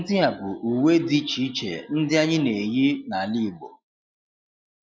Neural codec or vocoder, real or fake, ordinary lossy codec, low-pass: none; real; none; none